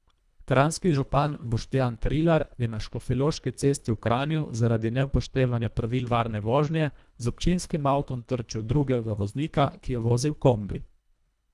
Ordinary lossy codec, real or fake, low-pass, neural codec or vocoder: none; fake; none; codec, 24 kHz, 1.5 kbps, HILCodec